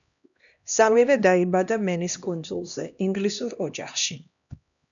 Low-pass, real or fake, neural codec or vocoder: 7.2 kHz; fake; codec, 16 kHz, 1 kbps, X-Codec, HuBERT features, trained on LibriSpeech